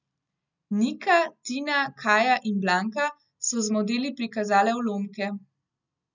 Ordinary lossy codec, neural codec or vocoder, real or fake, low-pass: none; none; real; 7.2 kHz